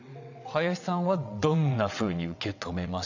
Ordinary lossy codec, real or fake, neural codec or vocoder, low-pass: none; fake; vocoder, 22.05 kHz, 80 mel bands, WaveNeXt; 7.2 kHz